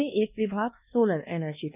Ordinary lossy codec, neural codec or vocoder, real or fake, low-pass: none; codec, 24 kHz, 1.2 kbps, DualCodec; fake; 3.6 kHz